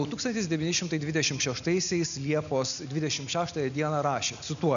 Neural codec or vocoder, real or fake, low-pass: none; real; 7.2 kHz